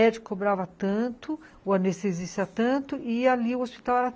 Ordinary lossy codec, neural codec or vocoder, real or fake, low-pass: none; none; real; none